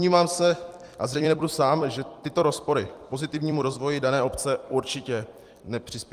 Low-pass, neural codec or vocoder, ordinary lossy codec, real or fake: 14.4 kHz; vocoder, 44.1 kHz, 128 mel bands every 256 samples, BigVGAN v2; Opus, 32 kbps; fake